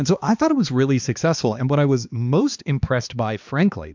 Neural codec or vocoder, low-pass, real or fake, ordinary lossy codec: codec, 16 kHz, 2 kbps, X-Codec, HuBERT features, trained on balanced general audio; 7.2 kHz; fake; MP3, 64 kbps